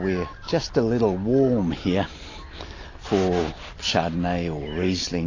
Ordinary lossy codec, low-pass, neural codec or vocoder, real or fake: AAC, 32 kbps; 7.2 kHz; none; real